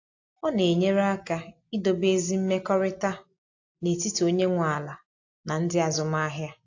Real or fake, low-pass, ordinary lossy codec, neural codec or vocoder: real; 7.2 kHz; AAC, 48 kbps; none